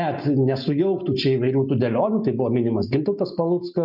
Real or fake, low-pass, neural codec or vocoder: real; 5.4 kHz; none